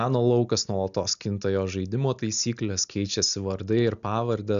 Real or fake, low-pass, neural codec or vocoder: real; 7.2 kHz; none